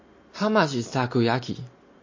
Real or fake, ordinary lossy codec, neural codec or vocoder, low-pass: real; MP3, 32 kbps; none; 7.2 kHz